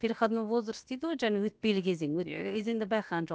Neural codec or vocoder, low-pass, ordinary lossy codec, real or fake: codec, 16 kHz, about 1 kbps, DyCAST, with the encoder's durations; none; none; fake